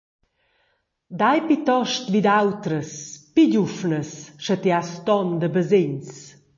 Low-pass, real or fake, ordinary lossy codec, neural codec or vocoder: 7.2 kHz; real; MP3, 32 kbps; none